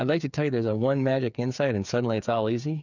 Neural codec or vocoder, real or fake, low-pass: codec, 16 kHz, 8 kbps, FreqCodec, smaller model; fake; 7.2 kHz